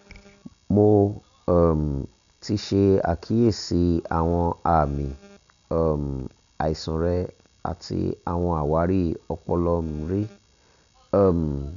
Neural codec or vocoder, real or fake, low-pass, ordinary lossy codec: none; real; 7.2 kHz; none